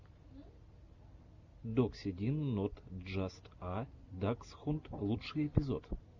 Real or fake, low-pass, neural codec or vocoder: real; 7.2 kHz; none